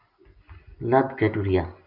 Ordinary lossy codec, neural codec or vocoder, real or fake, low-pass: AAC, 48 kbps; none; real; 5.4 kHz